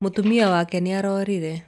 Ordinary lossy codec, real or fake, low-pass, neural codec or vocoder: none; real; none; none